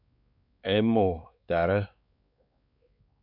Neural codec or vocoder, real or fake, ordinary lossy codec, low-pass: codec, 16 kHz, 4 kbps, X-Codec, WavLM features, trained on Multilingual LibriSpeech; fake; AAC, 48 kbps; 5.4 kHz